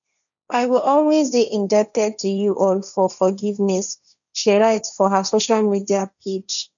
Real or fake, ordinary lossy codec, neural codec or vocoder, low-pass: fake; none; codec, 16 kHz, 1.1 kbps, Voila-Tokenizer; 7.2 kHz